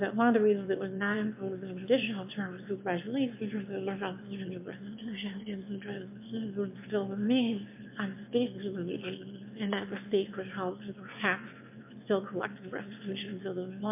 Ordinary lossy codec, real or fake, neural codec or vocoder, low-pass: MP3, 32 kbps; fake; autoencoder, 22.05 kHz, a latent of 192 numbers a frame, VITS, trained on one speaker; 3.6 kHz